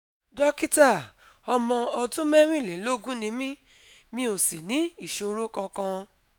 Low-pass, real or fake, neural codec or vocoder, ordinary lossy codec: none; fake; autoencoder, 48 kHz, 128 numbers a frame, DAC-VAE, trained on Japanese speech; none